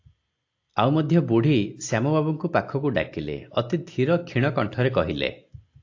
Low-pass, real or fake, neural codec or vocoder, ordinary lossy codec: 7.2 kHz; real; none; AAC, 48 kbps